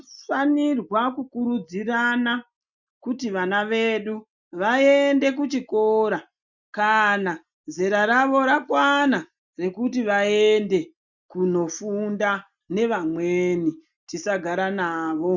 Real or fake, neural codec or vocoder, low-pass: real; none; 7.2 kHz